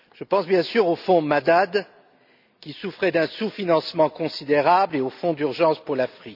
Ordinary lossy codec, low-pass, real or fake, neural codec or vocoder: none; 5.4 kHz; real; none